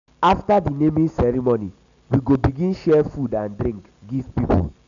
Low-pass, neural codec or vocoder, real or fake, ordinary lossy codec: 7.2 kHz; none; real; none